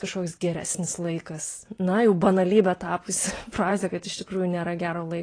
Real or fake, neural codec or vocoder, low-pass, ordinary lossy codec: fake; vocoder, 44.1 kHz, 128 mel bands every 512 samples, BigVGAN v2; 9.9 kHz; AAC, 32 kbps